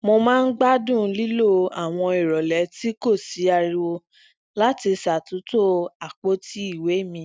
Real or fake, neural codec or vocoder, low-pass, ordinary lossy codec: real; none; none; none